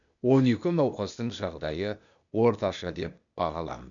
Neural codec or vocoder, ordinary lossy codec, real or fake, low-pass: codec, 16 kHz, 0.8 kbps, ZipCodec; none; fake; 7.2 kHz